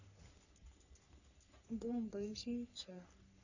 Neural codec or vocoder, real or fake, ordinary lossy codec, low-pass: codec, 44.1 kHz, 3.4 kbps, Pupu-Codec; fake; none; 7.2 kHz